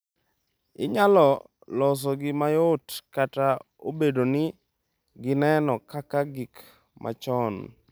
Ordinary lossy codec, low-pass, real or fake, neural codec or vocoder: none; none; real; none